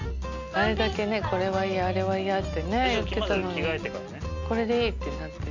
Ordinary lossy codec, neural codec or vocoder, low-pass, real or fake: none; none; 7.2 kHz; real